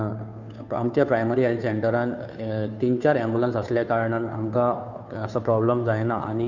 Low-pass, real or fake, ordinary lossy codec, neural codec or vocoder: 7.2 kHz; fake; none; codec, 16 kHz, 2 kbps, FunCodec, trained on Chinese and English, 25 frames a second